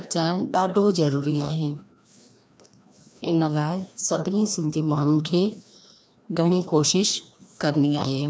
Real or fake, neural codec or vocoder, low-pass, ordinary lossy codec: fake; codec, 16 kHz, 1 kbps, FreqCodec, larger model; none; none